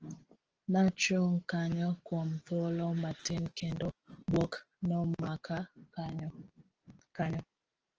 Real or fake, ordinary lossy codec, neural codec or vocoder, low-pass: real; Opus, 16 kbps; none; 7.2 kHz